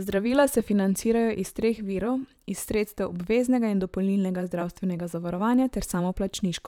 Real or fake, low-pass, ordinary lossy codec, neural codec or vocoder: fake; 19.8 kHz; none; vocoder, 44.1 kHz, 128 mel bands, Pupu-Vocoder